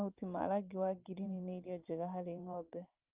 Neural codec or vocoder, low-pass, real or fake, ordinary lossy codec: vocoder, 44.1 kHz, 128 mel bands every 512 samples, BigVGAN v2; 3.6 kHz; fake; Opus, 32 kbps